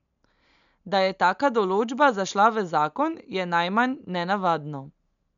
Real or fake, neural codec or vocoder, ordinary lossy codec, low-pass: real; none; none; 7.2 kHz